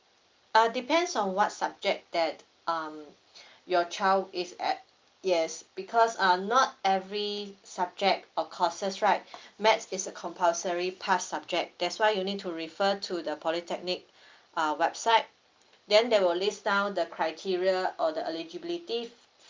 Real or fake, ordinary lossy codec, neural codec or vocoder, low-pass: real; Opus, 24 kbps; none; 7.2 kHz